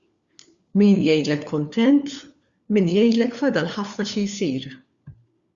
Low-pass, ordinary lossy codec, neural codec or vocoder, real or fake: 7.2 kHz; Opus, 64 kbps; codec, 16 kHz, 4 kbps, FunCodec, trained on LibriTTS, 50 frames a second; fake